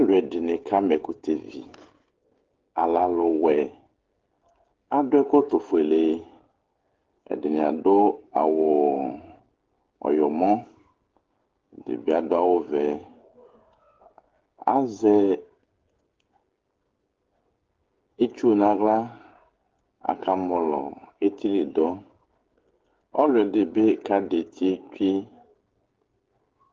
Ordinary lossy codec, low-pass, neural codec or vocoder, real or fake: Opus, 16 kbps; 7.2 kHz; codec, 16 kHz, 16 kbps, FreqCodec, smaller model; fake